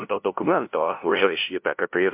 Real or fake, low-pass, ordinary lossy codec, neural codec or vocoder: fake; 3.6 kHz; MP3, 32 kbps; codec, 16 kHz, 0.5 kbps, FunCodec, trained on LibriTTS, 25 frames a second